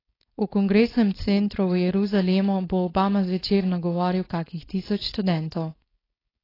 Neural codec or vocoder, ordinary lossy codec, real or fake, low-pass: codec, 16 kHz, 4.8 kbps, FACodec; AAC, 24 kbps; fake; 5.4 kHz